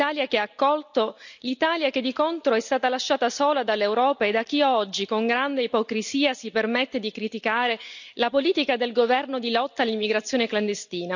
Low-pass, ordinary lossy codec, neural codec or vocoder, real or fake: 7.2 kHz; none; none; real